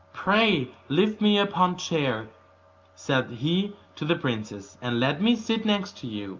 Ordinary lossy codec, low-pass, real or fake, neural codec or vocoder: Opus, 24 kbps; 7.2 kHz; real; none